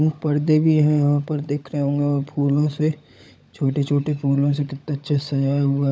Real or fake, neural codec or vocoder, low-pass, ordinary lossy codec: fake; codec, 16 kHz, 16 kbps, FunCodec, trained on LibriTTS, 50 frames a second; none; none